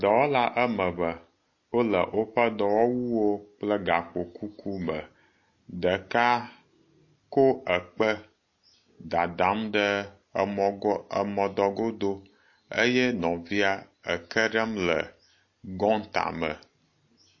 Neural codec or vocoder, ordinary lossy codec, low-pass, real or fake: none; MP3, 32 kbps; 7.2 kHz; real